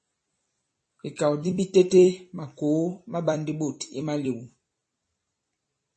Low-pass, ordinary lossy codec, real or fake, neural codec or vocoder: 10.8 kHz; MP3, 32 kbps; fake; vocoder, 44.1 kHz, 128 mel bands every 256 samples, BigVGAN v2